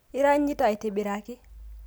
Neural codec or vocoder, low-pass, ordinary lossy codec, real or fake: none; none; none; real